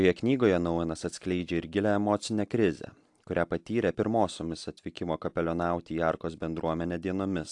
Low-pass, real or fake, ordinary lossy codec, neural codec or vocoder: 10.8 kHz; real; AAC, 64 kbps; none